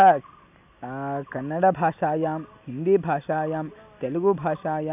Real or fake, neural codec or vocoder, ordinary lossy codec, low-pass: real; none; none; 3.6 kHz